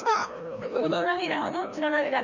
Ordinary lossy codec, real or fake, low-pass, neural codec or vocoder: none; fake; 7.2 kHz; codec, 16 kHz, 1 kbps, FreqCodec, larger model